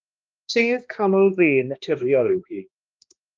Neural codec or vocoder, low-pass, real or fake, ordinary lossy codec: codec, 16 kHz, 1 kbps, X-Codec, HuBERT features, trained on balanced general audio; 7.2 kHz; fake; Opus, 32 kbps